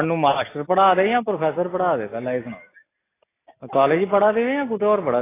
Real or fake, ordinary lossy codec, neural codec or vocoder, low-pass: real; AAC, 16 kbps; none; 3.6 kHz